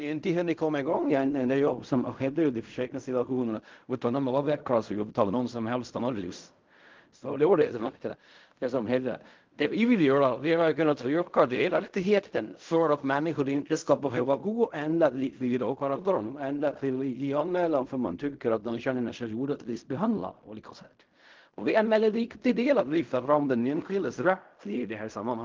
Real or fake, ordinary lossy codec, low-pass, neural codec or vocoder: fake; Opus, 32 kbps; 7.2 kHz; codec, 16 kHz in and 24 kHz out, 0.4 kbps, LongCat-Audio-Codec, fine tuned four codebook decoder